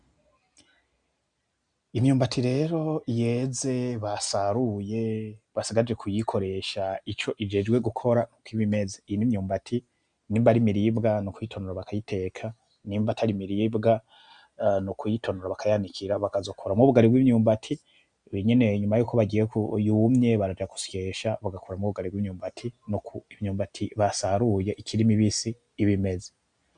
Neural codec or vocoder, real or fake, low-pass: none; real; 9.9 kHz